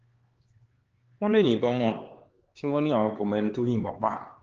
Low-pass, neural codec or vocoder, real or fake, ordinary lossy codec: 7.2 kHz; codec, 16 kHz, 2 kbps, X-Codec, HuBERT features, trained on LibriSpeech; fake; Opus, 16 kbps